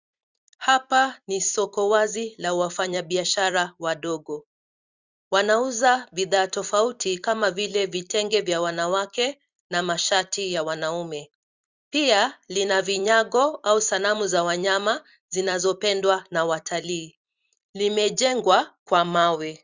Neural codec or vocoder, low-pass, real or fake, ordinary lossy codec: vocoder, 44.1 kHz, 128 mel bands every 256 samples, BigVGAN v2; 7.2 kHz; fake; Opus, 64 kbps